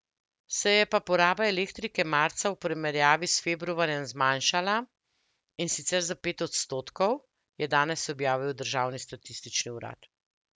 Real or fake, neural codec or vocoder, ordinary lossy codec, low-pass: real; none; none; none